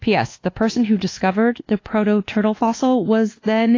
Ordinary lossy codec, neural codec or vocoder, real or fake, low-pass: AAC, 32 kbps; codec, 24 kHz, 1.2 kbps, DualCodec; fake; 7.2 kHz